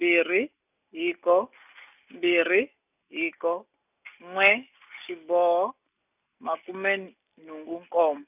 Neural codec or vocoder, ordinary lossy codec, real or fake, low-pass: none; none; real; 3.6 kHz